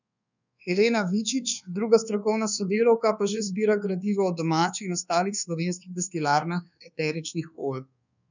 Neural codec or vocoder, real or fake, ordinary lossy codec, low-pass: codec, 24 kHz, 1.2 kbps, DualCodec; fake; none; 7.2 kHz